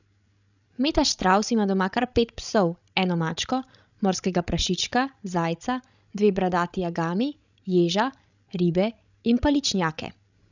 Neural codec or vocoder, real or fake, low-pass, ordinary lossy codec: codec, 16 kHz, 16 kbps, FreqCodec, larger model; fake; 7.2 kHz; none